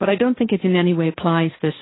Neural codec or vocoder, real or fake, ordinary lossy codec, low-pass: codec, 16 kHz, 1.1 kbps, Voila-Tokenizer; fake; AAC, 16 kbps; 7.2 kHz